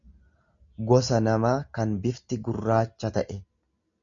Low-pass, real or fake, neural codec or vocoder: 7.2 kHz; real; none